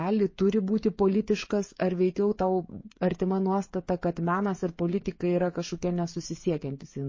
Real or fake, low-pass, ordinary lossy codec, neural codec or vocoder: fake; 7.2 kHz; MP3, 32 kbps; codec, 16 kHz, 16 kbps, FreqCodec, smaller model